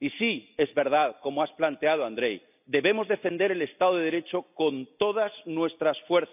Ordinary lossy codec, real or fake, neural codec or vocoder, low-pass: none; real; none; 3.6 kHz